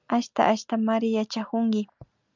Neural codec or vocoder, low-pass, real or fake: none; 7.2 kHz; real